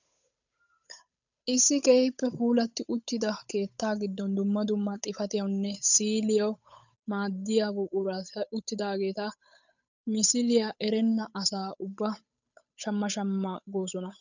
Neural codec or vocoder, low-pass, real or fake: codec, 16 kHz, 8 kbps, FunCodec, trained on Chinese and English, 25 frames a second; 7.2 kHz; fake